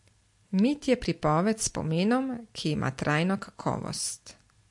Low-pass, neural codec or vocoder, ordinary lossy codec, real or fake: 10.8 kHz; none; MP3, 48 kbps; real